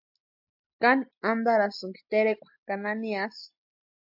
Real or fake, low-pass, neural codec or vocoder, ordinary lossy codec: real; 5.4 kHz; none; AAC, 48 kbps